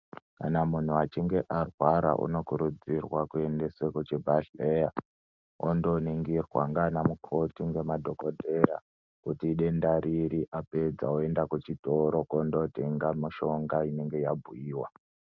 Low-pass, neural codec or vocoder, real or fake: 7.2 kHz; none; real